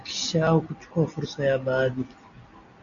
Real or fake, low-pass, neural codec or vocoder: real; 7.2 kHz; none